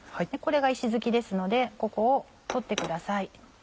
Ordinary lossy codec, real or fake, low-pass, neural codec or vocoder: none; real; none; none